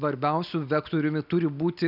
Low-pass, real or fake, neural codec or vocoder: 5.4 kHz; real; none